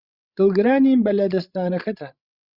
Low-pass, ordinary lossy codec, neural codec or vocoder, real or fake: 5.4 kHz; Opus, 64 kbps; codec, 16 kHz, 16 kbps, FreqCodec, larger model; fake